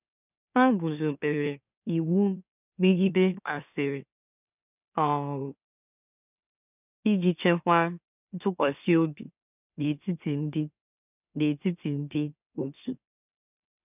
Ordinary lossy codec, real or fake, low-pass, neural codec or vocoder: none; fake; 3.6 kHz; autoencoder, 44.1 kHz, a latent of 192 numbers a frame, MeloTTS